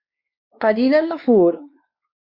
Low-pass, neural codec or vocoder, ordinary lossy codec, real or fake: 5.4 kHz; codec, 16 kHz, 2 kbps, X-Codec, WavLM features, trained on Multilingual LibriSpeech; Opus, 64 kbps; fake